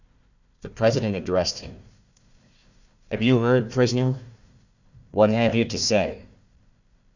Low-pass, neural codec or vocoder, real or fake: 7.2 kHz; codec, 16 kHz, 1 kbps, FunCodec, trained on Chinese and English, 50 frames a second; fake